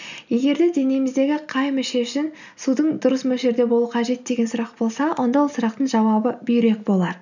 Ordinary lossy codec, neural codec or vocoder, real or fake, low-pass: none; none; real; 7.2 kHz